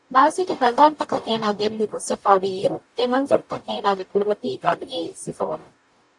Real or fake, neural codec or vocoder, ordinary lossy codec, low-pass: fake; codec, 44.1 kHz, 0.9 kbps, DAC; AAC, 64 kbps; 10.8 kHz